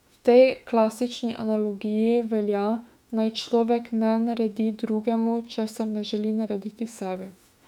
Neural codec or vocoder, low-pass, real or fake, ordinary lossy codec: autoencoder, 48 kHz, 32 numbers a frame, DAC-VAE, trained on Japanese speech; 19.8 kHz; fake; none